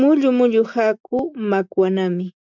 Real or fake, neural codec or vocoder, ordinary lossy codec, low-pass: real; none; MP3, 64 kbps; 7.2 kHz